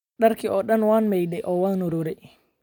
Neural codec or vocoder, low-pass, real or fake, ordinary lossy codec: none; 19.8 kHz; real; none